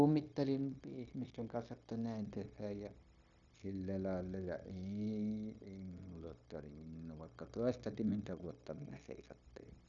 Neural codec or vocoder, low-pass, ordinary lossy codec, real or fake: codec, 16 kHz, 0.9 kbps, LongCat-Audio-Codec; 7.2 kHz; none; fake